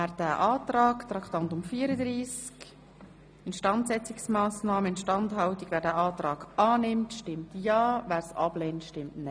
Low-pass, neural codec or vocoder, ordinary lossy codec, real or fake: none; none; none; real